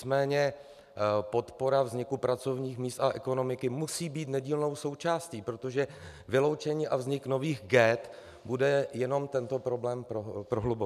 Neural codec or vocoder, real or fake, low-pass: none; real; 14.4 kHz